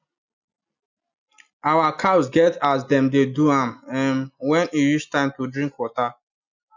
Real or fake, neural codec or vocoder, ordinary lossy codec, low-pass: real; none; none; 7.2 kHz